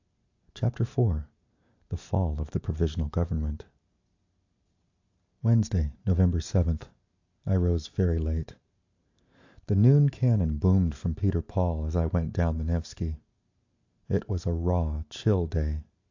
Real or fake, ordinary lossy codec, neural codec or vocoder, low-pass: real; AAC, 48 kbps; none; 7.2 kHz